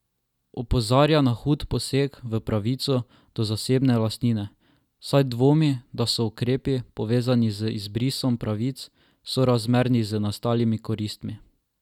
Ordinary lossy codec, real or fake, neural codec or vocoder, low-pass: none; real; none; 19.8 kHz